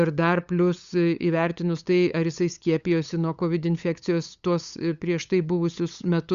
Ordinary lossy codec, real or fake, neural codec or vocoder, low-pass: Opus, 64 kbps; fake; codec, 16 kHz, 8 kbps, FunCodec, trained on LibriTTS, 25 frames a second; 7.2 kHz